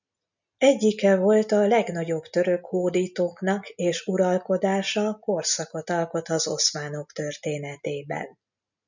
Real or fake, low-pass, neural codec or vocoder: real; 7.2 kHz; none